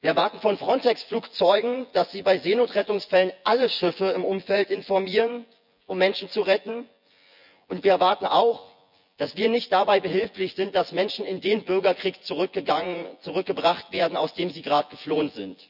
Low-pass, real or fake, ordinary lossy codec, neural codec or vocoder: 5.4 kHz; fake; none; vocoder, 24 kHz, 100 mel bands, Vocos